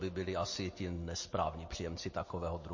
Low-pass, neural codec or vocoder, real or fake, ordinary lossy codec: 7.2 kHz; none; real; MP3, 32 kbps